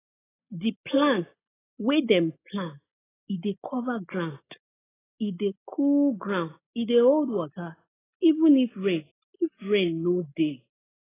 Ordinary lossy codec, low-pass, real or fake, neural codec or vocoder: AAC, 16 kbps; 3.6 kHz; real; none